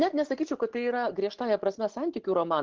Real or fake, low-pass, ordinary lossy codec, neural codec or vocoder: real; 7.2 kHz; Opus, 16 kbps; none